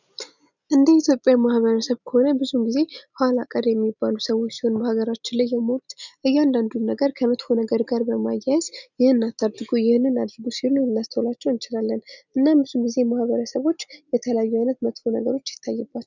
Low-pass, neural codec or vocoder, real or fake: 7.2 kHz; none; real